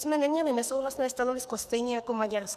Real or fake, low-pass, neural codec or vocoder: fake; 14.4 kHz; codec, 44.1 kHz, 2.6 kbps, SNAC